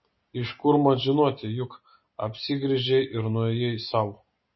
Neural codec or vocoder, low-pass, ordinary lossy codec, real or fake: none; 7.2 kHz; MP3, 24 kbps; real